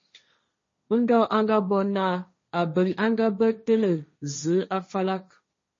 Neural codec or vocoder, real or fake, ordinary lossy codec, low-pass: codec, 16 kHz, 1.1 kbps, Voila-Tokenizer; fake; MP3, 32 kbps; 7.2 kHz